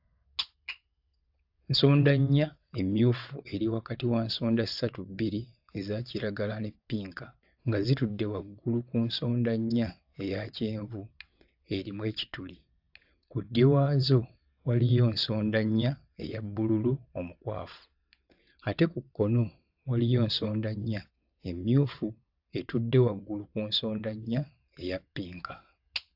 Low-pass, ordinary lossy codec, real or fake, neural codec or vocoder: 5.4 kHz; AAC, 48 kbps; fake; vocoder, 22.05 kHz, 80 mel bands, WaveNeXt